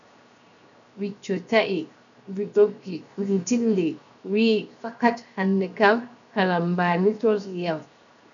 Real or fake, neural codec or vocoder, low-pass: fake; codec, 16 kHz, 0.7 kbps, FocalCodec; 7.2 kHz